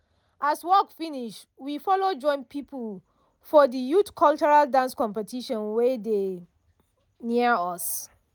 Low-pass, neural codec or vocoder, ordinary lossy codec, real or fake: none; none; none; real